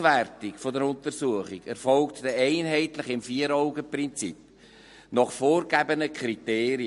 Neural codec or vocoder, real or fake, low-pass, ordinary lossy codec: none; real; 14.4 kHz; MP3, 48 kbps